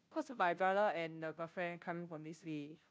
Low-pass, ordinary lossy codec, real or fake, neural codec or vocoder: none; none; fake; codec, 16 kHz, 0.5 kbps, FunCodec, trained on Chinese and English, 25 frames a second